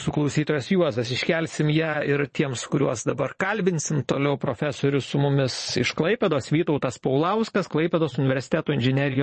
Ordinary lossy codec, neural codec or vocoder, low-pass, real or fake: MP3, 32 kbps; vocoder, 22.05 kHz, 80 mel bands, Vocos; 9.9 kHz; fake